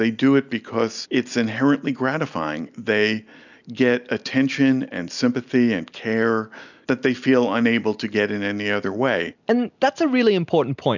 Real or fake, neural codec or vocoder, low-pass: real; none; 7.2 kHz